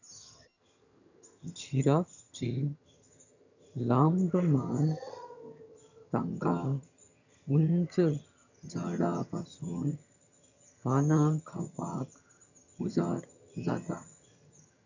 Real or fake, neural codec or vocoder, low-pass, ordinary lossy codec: fake; vocoder, 22.05 kHz, 80 mel bands, HiFi-GAN; 7.2 kHz; none